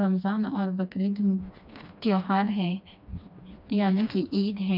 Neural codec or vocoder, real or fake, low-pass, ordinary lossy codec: codec, 16 kHz, 2 kbps, FreqCodec, smaller model; fake; 5.4 kHz; none